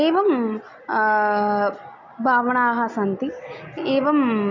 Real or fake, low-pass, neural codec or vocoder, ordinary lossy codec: real; 7.2 kHz; none; none